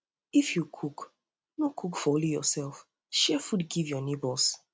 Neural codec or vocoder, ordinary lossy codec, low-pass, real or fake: none; none; none; real